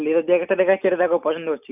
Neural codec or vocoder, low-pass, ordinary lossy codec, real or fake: none; 3.6 kHz; none; real